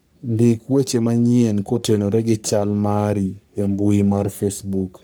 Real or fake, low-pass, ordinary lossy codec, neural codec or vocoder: fake; none; none; codec, 44.1 kHz, 3.4 kbps, Pupu-Codec